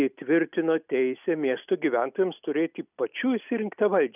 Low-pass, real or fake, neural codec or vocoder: 3.6 kHz; real; none